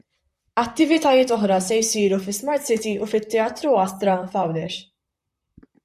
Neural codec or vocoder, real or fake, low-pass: vocoder, 44.1 kHz, 128 mel bands, Pupu-Vocoder; fake; 14.4 kHz